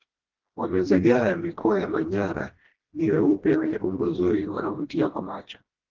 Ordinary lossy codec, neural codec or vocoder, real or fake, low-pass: Opus, 16 kbps; codec, 16 kHz, 1 kbps, FreqCodec, smaller model; fake; 7.2 kHz